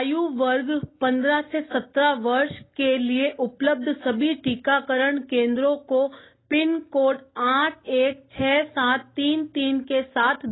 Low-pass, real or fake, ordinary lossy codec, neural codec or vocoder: 7.2 kHz; real; AAC, 16 kbps; none